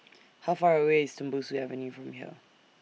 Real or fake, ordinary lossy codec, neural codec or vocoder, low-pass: real; none; none; none